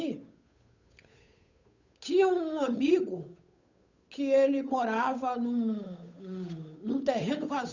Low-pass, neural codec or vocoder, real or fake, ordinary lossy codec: 7.2 kHz; codec, 16 kHz, 8 kbps, FunCodec, trained on Chinese and English, 25 frames a second; fake; none